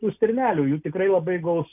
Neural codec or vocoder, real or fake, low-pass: none; real; 3.6 kHz